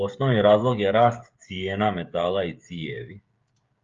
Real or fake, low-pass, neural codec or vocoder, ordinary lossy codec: fake; 7.2 kHz; codec, 16 kHz, 16 kbps, FreqCodec, smaller model; Opus, 32 kbps